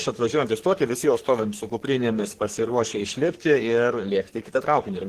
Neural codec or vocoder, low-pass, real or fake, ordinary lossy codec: codec, 44.1 kHz, 3.4 kbps, Pupu-Codec; 14.4 kHz; fake; Opus, 16 kbps